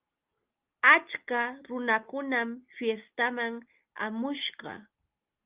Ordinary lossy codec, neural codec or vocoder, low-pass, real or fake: Opus, 24 kbps; none; 3.6 kHz; real